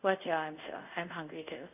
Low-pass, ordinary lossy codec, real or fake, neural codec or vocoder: 3.6 kHz; none; fake; codec, 24 kHz, 0.5 kbps, DualCodec